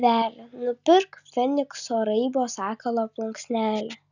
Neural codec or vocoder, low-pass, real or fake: none; 7.2 kHz; real